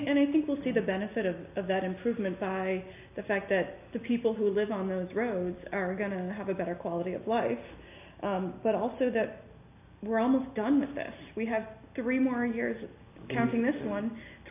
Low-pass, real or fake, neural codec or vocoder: 3.6 kHz; real; none